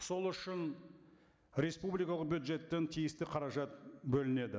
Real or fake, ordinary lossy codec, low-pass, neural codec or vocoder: real; none; none; none